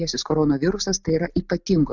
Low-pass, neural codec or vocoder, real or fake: 7.2 kHz; none; real